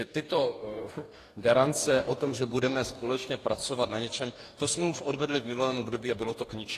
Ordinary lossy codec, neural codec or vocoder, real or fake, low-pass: AAC, 48 kbps; codec, 44.1 kHz, 2.6 kbps, DAC; fake; 14.4 kHz